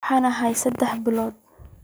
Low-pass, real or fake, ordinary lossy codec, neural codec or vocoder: none; real; none; none